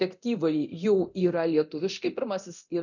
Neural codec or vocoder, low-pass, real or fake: codec, 24 kHz, 0.9 kbps, DualCodec; 7.2 kHz; fake